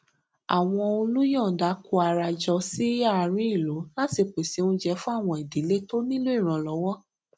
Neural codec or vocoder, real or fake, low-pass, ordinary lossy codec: none; real; none; none